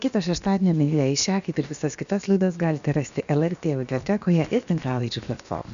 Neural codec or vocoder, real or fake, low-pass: codec, 16 kHz, about 1 kbps, DyCAST, with the encoder's durations; fake; 7.2 kHz